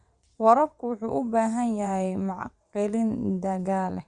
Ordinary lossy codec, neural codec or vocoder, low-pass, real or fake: Opus, 64 kbps; vocoder, 22.05 kHz, 80 mel bands, WaveNeXt; 9.9 kHz; fake